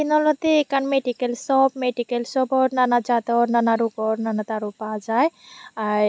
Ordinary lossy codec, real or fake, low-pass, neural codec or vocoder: none; real; none; none